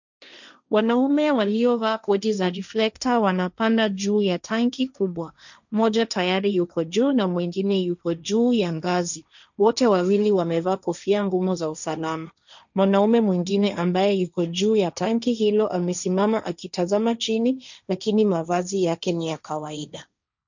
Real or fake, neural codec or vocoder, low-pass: fake; codec, 16 kHz, 1.1 kbps, Voila-Tokenizer; 7.2 kHz